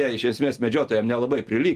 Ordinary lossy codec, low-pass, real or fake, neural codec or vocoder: Opus, 32 kbps; 14.4 kHz; real; none